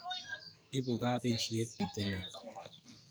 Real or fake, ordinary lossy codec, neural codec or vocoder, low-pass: fake; none; codec, 44.1 kHz, 2.6 kbps, SNAC; none